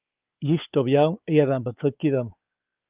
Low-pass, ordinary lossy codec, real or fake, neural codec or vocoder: 3.6 kHz; Opus, 32 kbps; fake; codec, 16 kHz, 4 kbps, X-Codec, WavLM features, trained on Multilingual LibriSpeech